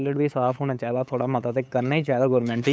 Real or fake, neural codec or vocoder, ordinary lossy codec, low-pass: fake; codec, 16 kHz, 8 kbps, FunCodec, trained on LibriTTS, 25 frames a second; none; none